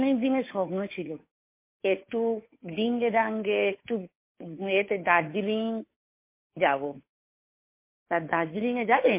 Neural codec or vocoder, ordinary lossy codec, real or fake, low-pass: codec, 16 kHz, 2 kbps, FunCodec, trained on Chinese and English, 25 frames a second; MP3, 24 kbps; fake; 3.6 kHz